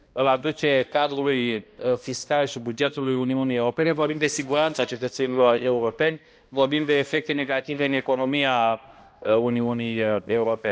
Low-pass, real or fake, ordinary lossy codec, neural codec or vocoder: none; fake; none; codec, 16 kHz, 1 kbps, X-Codec, HuBERT features, trained on balanced general audio